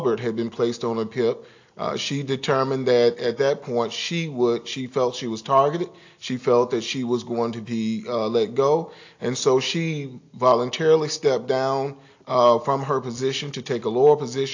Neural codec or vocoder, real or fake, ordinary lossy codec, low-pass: none; real; AAC, 48 kbps; 7.2 kHz